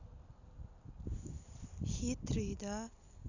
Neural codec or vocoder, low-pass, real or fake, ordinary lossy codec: none; 7.2 kHz; real; none